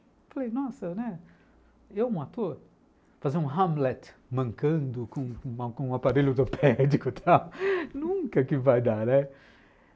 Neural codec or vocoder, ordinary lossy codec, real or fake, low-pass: none; none; real; none